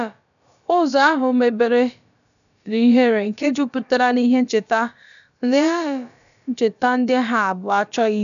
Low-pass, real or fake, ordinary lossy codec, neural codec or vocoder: 7.2 kHz; fake; none; codec, 16 kHz, about 1 kbps, DyCAST, with the encoder's durations